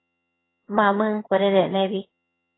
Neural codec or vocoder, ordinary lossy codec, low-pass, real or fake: vocoder, 22.05 kHz, 80 mel bands, HiFi-GAN; AAC, 16 kbps; 7.2 kHz; fake